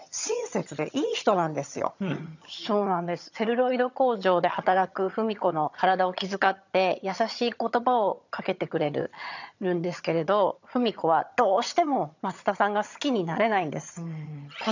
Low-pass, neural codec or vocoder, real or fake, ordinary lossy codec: 7.2 kHz; vocoder, 22.05 kHz, 80 mel bands, HiFi-GAN; fake; none